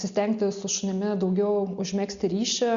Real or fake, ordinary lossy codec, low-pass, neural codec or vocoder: real; Opus, 64 kbps; 7.2 kHz; none